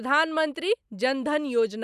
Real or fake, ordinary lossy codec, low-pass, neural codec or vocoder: real; none; 14.4 kHz; none